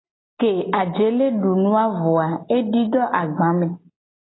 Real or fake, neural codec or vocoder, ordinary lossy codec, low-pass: real; none; AAC, 16 kbps; 7.2 kHz